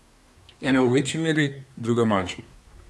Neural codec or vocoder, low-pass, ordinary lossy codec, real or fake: codec, 24 kHz, 1 kbps, SNAC; none; none; fake